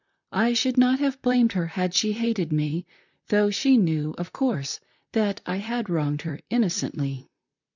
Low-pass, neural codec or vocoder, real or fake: 7.2 kHz; vocoder, 44.1 kHz, 128 mel bands, Pupu-Vocoder; fake